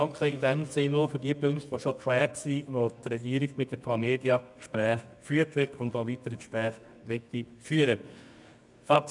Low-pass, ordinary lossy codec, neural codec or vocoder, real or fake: 10.8 kHz; none; codec, 24 kHz, 0.9 kbps, WavTokenizer, medium music audio release; fake